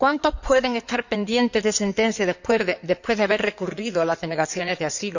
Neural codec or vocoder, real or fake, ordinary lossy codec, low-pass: codec, 16 kHz in and 24 kHz out, 2.2 kbps, FireRedTTS-2 codec; fake; none; 7.2 kHz